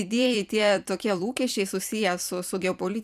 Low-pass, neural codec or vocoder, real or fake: 14.4 kHz; vocoder, 44.1 kHz, 128 mel bands every 256 samples, BigVGAN v2; fake